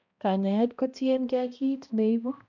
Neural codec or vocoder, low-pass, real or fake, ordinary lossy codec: codec, 16 kHz, 1 kbps, X-Codec, HuBERT features, trained on balanced general audio; 7.2 kHz; fake; none